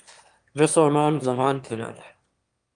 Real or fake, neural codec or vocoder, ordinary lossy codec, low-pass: fake; autoencoder, 22.05 kHz, a latent of 192 numbers a frame, VITS, trained on one speaker; Opus, 32 kbps; 9.9 kHz